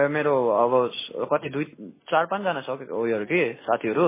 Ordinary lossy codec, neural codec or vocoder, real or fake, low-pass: MP3, 16 kbps; none; real; 3.6 kHz